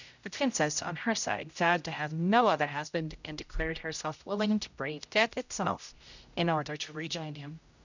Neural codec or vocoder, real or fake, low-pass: codec, 16 kHz, 0.5 kbps, X-Codec, HuBERT features, trained on general audio; fake; 7.2 kHz